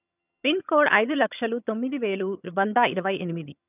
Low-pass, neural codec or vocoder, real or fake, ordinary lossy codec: 3.6 kHz; vocoder, 22.05 kHz, 80 mel bands, HiFi-GAN; fake; none